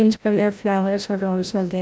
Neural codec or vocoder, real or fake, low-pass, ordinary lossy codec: codec, 16 kHz, 0.5 kbps, FreqCodec, larger model; fake; none; none